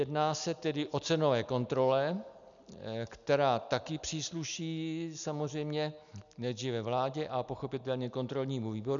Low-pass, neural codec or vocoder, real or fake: 7.2 kHz; none; real